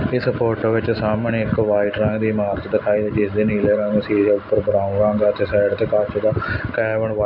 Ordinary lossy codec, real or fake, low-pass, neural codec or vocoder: none; real; 5.4 kHz; none